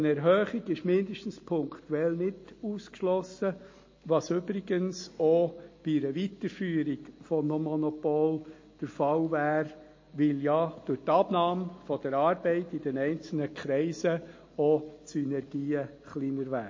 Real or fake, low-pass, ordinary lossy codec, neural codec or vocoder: real; 7.2 kHz; MP3, 32 kbps; none